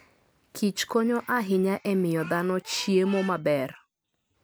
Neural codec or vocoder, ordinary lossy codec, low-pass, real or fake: none; none; none; real